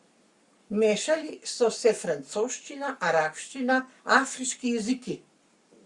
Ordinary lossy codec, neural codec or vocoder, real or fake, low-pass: Opus, 64 kbps; codec, 44.1 kHz, 7.8 kbps, Pupu-Codec; fake; 10.8 kHz